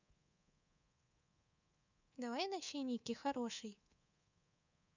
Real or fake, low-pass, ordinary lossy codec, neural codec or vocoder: fake; 7.2 kHz; none; codec, 24 kHz, 3.1 kbps, DualCodec